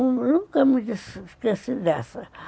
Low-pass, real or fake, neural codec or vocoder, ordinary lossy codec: none; real; none; none